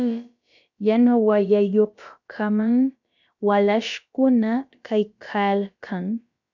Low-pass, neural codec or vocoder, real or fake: 7.2 kHz; codec, 16 kHz, about 1 kbps, DyCAST, with the encoder's durations; fake